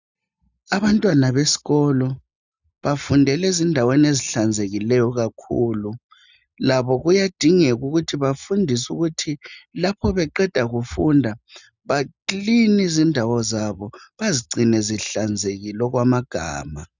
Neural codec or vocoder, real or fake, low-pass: none; real; 7.2 kHz